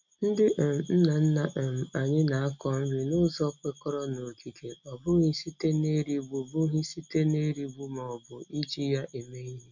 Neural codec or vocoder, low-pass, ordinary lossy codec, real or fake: none; 7.2 kHz; none; real